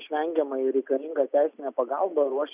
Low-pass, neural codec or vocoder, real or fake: 3.6 kHz; none; real